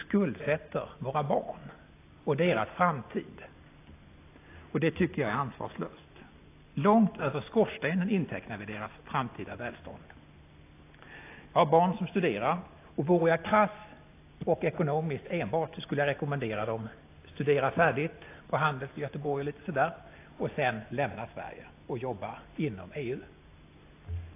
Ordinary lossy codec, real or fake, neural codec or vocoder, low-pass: AAC, 24 kbps; real; none; 3.6 kHz